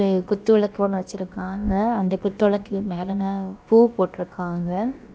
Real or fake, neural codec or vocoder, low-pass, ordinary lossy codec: fake; codec, 16 kHz, about 1 kbps, DyCAST, with the encoder's durations; none; none